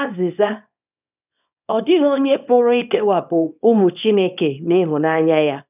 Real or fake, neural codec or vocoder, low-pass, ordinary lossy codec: fake; codec, 24 kHz, 0.9 kbps, WavTokenizer, small release; 3.6 kHz; none